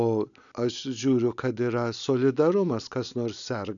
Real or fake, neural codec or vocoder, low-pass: real; none; 7.2 kHz